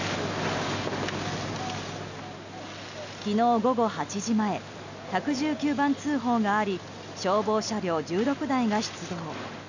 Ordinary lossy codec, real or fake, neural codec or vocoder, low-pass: none; real; none; 7.2 kHz